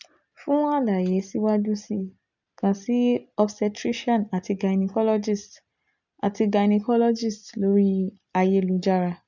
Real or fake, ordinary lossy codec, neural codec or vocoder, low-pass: real; none; none; 7.2 kHz